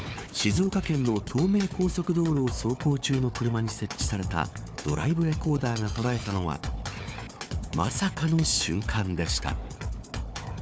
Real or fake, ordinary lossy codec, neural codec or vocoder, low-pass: fake; none; codec, 16 kHz, 4 kbps, FunCodec, trained on Chinese and English, 50 frames a second; none